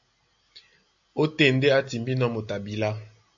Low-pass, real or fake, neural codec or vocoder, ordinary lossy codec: 7.2 kHz; real; none; AAC, 64 kbps